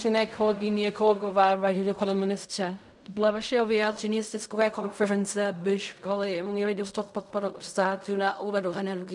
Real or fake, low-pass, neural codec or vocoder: fake; 10.8 kHz; codec, 16 kHz in and 24 kHz out, 0.4 kbps, LongCat-Audio-Codec, fine tuned four codebook decoder